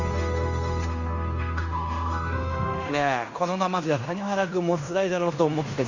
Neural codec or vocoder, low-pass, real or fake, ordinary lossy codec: codec, 16 kHz in and 24 kHz out, 0.9 kbps, LongCat-Audio-Codec, fine tuned four codebook decoder; 7.2 kHz; fake; Opus, 64 kbps